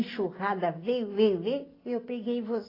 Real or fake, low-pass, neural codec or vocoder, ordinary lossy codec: real; 5.4 kHz; none; AAC, 24 kbps